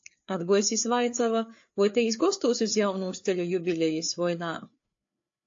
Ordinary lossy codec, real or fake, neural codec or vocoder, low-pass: AAC, 48 kbps; fake; codec, 16 kHz, 4 kbps, FreqCodec, larger model; 7.2 kHz